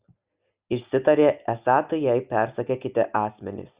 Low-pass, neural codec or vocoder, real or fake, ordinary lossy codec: 3.6 kHz; none; real; Opus, 64 kbps